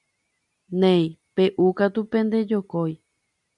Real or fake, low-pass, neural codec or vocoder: real; 10.8 kHz; none